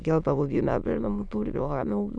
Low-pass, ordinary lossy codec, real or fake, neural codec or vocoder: 9.9 kHz; none; fake; autoencoder, 22.05 kHz, a latent of 192 numbers a frame, VITS, trained on many speakers